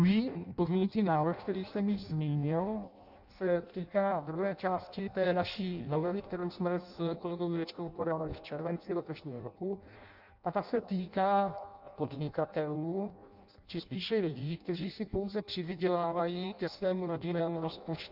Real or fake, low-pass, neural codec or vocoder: fake; 5.4 kHz; codec, 16 kHz in and 24 kHz out, 0.6 kbps, FireRedTTS-2 codec